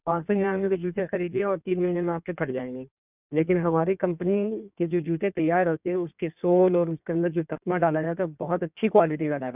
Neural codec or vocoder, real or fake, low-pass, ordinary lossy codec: codec, 16 kHz in and 24 kHz out, 1.1 kbps, FireRedTTS-2 codec; fake; 3.6 kHz; none